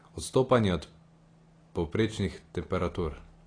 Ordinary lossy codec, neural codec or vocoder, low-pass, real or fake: AAC, 32 kbps; none; 9.9 kHz; real